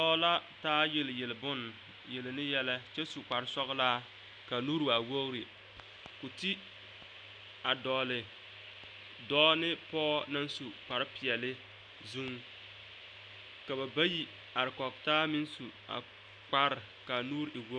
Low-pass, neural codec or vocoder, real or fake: 9.9 kHz; none; real